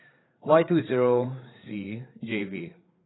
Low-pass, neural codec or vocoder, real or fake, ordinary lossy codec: 7.2 kHz; codec, 16 kHz, 8 kbps, FreqCodec, larger model; fake; AAC, 16 kbps